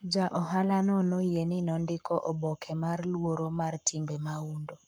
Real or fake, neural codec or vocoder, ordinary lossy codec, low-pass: fake; codec, 44.1 kHz, 7.8 kbps, Pupu-Codec; none; none